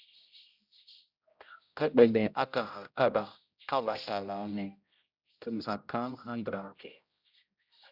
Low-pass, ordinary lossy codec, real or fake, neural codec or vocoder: 5.4 kHz; Opus, 64 kbps; fake; codec, 16 kHz, 0.5 kbps, X-Codec, HuBERT features, trained on general audio